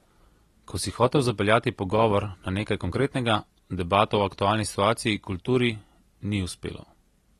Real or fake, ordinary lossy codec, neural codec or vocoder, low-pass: real; AAC, 32 kbps; none; 19.8 kHz